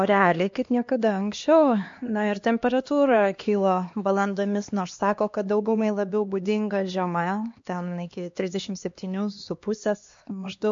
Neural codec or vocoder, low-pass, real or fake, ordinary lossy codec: codec, 16 kHz, 2 kbps, X-Codec, HuBERT features, trained on LibriSpeech; 7.2 kHz; fake; MP3, 48 kbps